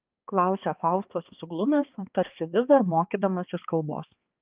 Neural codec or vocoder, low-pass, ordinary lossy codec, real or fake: codec, 16 kHz, 2 kbps, X-Codec, HuBERT features, trained on balanced general audio; 3.6 kHz; Opus, 24 kbps; fake